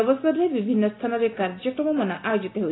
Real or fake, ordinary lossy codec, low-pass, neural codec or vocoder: real; AAC, 16 kbps; 7.2 kHz; none